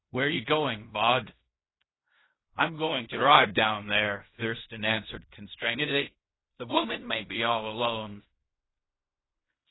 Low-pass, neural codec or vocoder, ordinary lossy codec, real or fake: 7.2 kHz; codec, 16 kHz in and 24 kHz out, 0.4 kbps, LongCat-Audio-Codec, fine tuned four codebook decoder; AAC, 16 kbps; fake